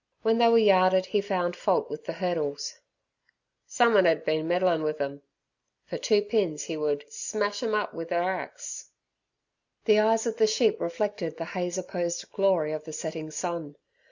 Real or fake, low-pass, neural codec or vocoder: real; 7.2 kHz; none